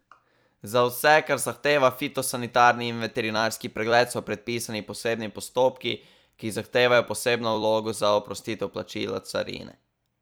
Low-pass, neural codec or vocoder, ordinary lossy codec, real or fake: none; vocoder, 44.1 kHz, 128 mel bands every 256 samples, BigVGAN v2; none; fake